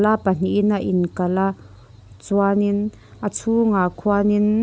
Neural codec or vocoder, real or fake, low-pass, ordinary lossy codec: none; real; none; none